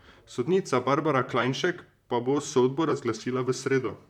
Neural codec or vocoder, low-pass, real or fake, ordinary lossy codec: vocoder, 44.1 kHz, 128 mel bands, Pupu-Vocoder; 19.8 kHz; fake; none